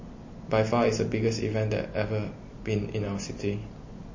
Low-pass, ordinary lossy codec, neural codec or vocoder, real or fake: 7.2 kHz; MP3, 32 kbps; none; real